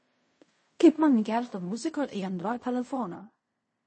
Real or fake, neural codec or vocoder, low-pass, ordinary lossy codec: fake; codec, 16 kHz in and 24 kHz out, 0.4 kbps, LongCat-Audio-Codec, fine tuned four codebook decoder; 9.9 kHz; MP3, 32 kbps